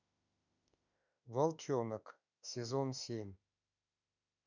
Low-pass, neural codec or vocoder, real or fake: 7.2 kHz; autoencoder, 48 kHz, 32 numbers a frame, DAC-VAE, trained on Japanese speech; fake